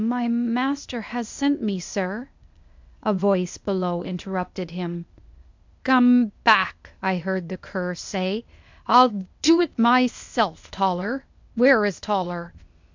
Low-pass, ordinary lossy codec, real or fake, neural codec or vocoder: 7.2 kHz; MP3, 64 kbps; fake; codec, 16 kHz, 0.8 kbps, ZipCodec